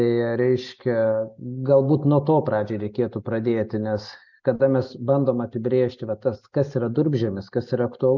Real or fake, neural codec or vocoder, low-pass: real; none; 7.2 kHz